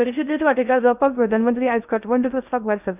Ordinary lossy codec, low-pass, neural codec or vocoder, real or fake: none; 3.6 kHz; codec, 16 kHz in and 24 kHz out, 0.6 kbps, FocalCodec, streaming, 2048 codes; fake